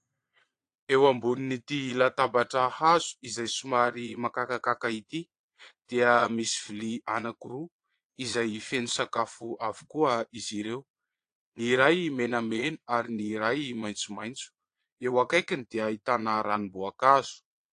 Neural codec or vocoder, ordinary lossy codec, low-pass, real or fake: vocoder, 22.05 kHz, 80 mel bands, Vocos; AAC, 48 kbps; 9.9 kHz; fake